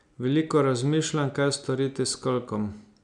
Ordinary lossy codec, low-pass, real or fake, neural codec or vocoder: none; 9.9 kHz; real; none